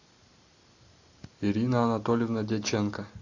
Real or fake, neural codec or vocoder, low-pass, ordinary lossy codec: real; none; 7.2 kHz; AAC, 48 kbps